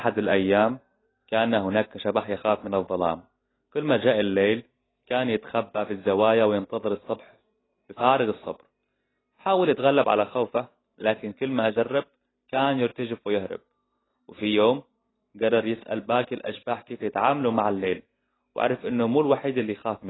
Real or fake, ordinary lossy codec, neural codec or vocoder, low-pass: real; AAC, 16 kbps; none; 7.2 kHz